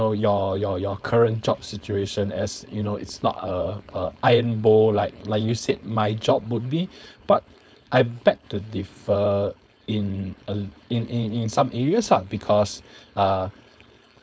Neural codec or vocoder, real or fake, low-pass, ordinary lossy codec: codec, 16 kHz, 4.8 kbps, FACodec; fake; none; none